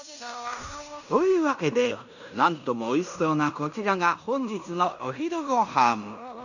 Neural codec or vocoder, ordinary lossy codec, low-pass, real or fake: codec, 16 kHz in and 24 kHz out, 0.9 kbps, LongCat-Audio-Codec, fine tuned four codebook decoder; none; 7.2 kHz; fake